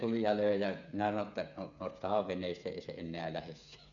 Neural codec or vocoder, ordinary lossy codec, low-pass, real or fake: codec, 16 kHz, 8 kbps, FreqCodec, smaller model; none; 7.2 kHz; fake